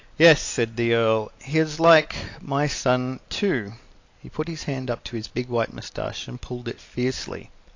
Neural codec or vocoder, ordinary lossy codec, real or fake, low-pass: none; AAC, 48 kbps; real; 7.2 kHz